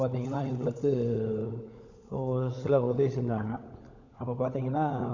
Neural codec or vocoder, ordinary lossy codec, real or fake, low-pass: codec, 16 kHz, 16 kbps, FunCodec, trained on LibriTTS, 50 frames a second; none; fake; 7.2 kHz